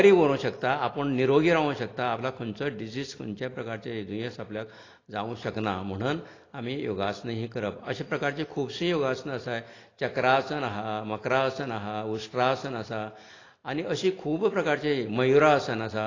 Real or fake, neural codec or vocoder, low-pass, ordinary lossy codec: real; none; 7.2 kHz; AAC, 32 kbps